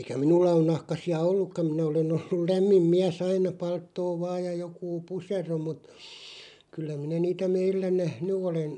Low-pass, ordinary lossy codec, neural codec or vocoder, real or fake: 10.8 kHz; none; none; real